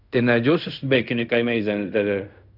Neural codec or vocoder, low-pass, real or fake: codec, 16 kHz in and 24 kHz out, 0.4 kbps, LongCat-Audio-Codec, fine tuned four codebook decoder; 5.4 kHz; fake